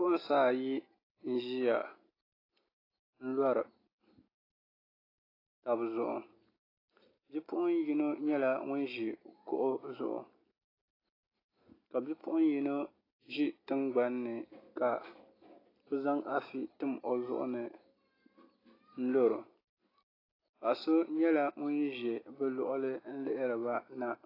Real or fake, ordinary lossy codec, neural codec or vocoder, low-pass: real; AAC, 24 kbps; none; 5.4 kHz